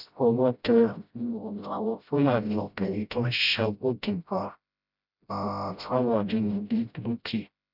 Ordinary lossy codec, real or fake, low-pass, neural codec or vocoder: none; fake; 5.4 kHz; codec, 16 kHz, 0.5 kbps, FreqCodec, smaller model